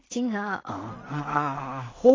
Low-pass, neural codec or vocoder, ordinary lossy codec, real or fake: 7.2 kHz; codec, 16 kHz in and 24 kHz out, 0.4 kbps, LongCat-Audio-Codec, two codebook decoder; AAC, 32 kbps; fake